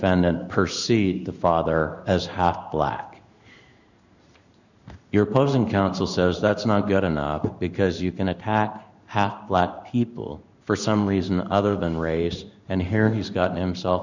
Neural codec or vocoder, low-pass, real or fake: codec, 16 kHz in and 24 kHz out, 1 kbps, XY-Tokenizer; 7.2 kHz; fake